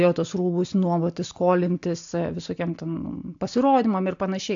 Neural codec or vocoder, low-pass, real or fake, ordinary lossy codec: none; 7.2 kHz; real; AAC, 64 kbps